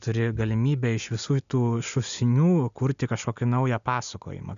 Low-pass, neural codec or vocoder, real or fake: 7.2 kHz; none; real